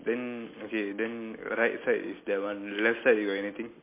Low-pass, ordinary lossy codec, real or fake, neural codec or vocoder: 3.6 kHz; MP3, 24 kbps; real; none